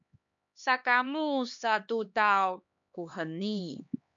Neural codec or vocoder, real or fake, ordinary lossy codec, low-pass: codec, 16 kHz, 2 kbps, X-Codec, HuBERT features, trained on LibriSpeech; fake; MP3, 64 kbps; 7.2 kHz